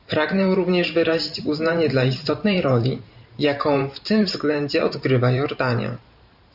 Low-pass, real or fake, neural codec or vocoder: 5.4 kHz; fake; vocoder, 44.1 kHz, 128 mel bands every 512 samples, BigVGAN v2